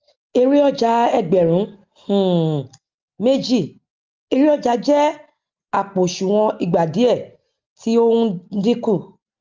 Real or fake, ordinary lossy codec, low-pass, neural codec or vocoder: real; Opus, 32 kbps; 7.2 kHz; none